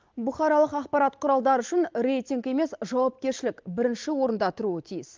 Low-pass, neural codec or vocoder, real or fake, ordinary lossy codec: 7.2 kHz; none; real; Opus, 32 kbps